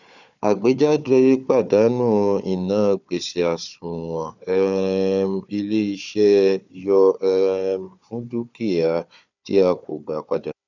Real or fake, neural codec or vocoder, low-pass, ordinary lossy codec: fake; codec, 16 kHz, 4 kbps, FunCodec, trained on Chinese and English, 50 frames a second; 7.2 kHz; none